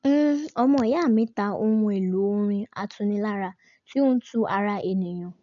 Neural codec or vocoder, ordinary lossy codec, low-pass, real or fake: none; none; 7.2 kHz; real